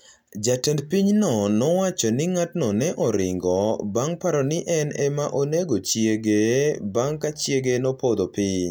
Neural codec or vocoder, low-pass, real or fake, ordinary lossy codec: none; 19.8 kHz; real; none